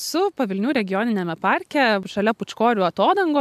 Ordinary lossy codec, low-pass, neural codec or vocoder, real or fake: AAC, 96 kbps; 14.4 kHz; none; real